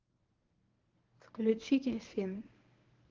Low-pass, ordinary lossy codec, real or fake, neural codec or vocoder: 7.2 kHz; Opus, 32 kbps; fake; codec, 24 kHz, 0.9 kbps, WavTokenizer, medium speech release version 1